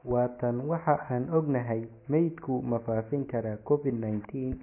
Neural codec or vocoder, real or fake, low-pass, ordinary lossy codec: none; real; 3.6 kHz; MP3, 24 kbps